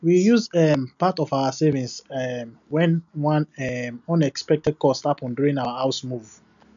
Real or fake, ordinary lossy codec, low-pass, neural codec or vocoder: real; none; 7.2 kHz; none